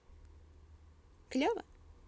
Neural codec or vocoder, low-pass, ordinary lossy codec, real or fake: none; none; none; real